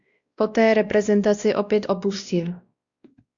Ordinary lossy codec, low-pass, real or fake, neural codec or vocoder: Opus, 64 kbps; 7.2 kHz; fake; codec, 16 kHz, 1 kbps, X-Codec, WavLM features, trained on Multilingual LibriSpeech